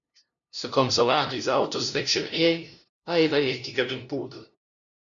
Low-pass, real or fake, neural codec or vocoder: 7.2 kHz; fake; codec, 16 kHz, 0.5 kbps, FunCodec, trained on LibriTTS, 25 frames a second